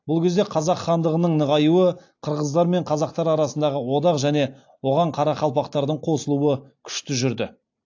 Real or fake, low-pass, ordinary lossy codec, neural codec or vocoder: real; 7.2 kHz; AAC, 48 kbps; none